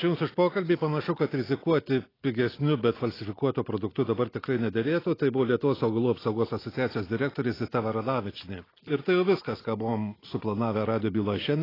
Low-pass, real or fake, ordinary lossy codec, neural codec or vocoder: 5.4 kHz; real; AAC, 24 kbps; none